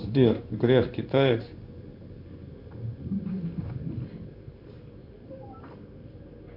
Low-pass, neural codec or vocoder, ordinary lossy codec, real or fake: 5.4 kHz; codec, 16 kHz in and 24 kHz out, 1 kbps, XY-Tokenizer; AAC, 48 kbps; fake